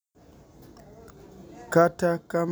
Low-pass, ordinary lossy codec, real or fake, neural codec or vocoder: none; none; real; none